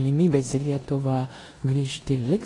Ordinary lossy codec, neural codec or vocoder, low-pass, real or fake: AAC, 32 kbps; codec, 16 kHz in and 24 kHz out, 0.9 kbps, LongCat-Audio-Codec, four codebook decoder; 10.8 kHz; fake